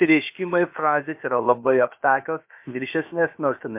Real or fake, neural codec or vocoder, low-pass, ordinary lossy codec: fake; codec, 16 kHz, about 1 kbps, DyCAST, with the encoder's durations; 3.6 kHz; MP3, 32 kbps